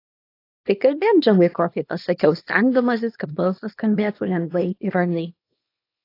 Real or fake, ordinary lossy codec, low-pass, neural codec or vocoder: fake; AAC, 32 kbps; 5.4 kHz; codec, 24 kHz, 0.9 kbps, WavTokenizer, small release